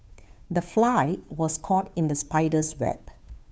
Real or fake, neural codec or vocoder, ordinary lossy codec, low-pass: fake; codec, 16 kHz, 2 kbps, FunCodec, trained on Chinese and English, 25 frames a second; none; none